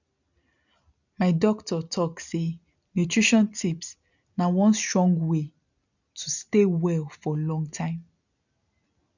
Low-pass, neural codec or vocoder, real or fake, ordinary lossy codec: 7.2 kHz; none; real; none